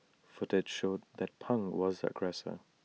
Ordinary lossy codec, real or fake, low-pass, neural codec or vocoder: none; real; none; none